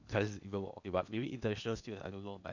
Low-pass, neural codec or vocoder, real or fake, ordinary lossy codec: 7.2 kHz; codec, 16 kHz in and 24 kHz out, 0.8 kbps, FocalCodec, streaming, 65536 codes; fake; none